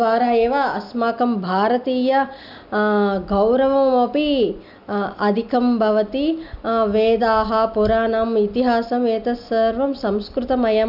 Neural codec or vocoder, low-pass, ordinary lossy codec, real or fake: none; 5.4 kHz; none; real